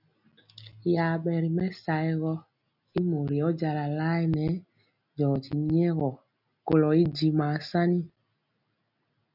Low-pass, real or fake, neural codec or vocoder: 5.4 kHz; real; none